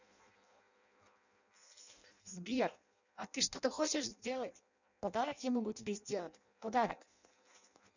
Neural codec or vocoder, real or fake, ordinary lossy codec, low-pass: codec, 16 kHz in and 24 kHz out, 0.6 kbps, FireRedTTS-2 codec; fake; none; 7.2 kHz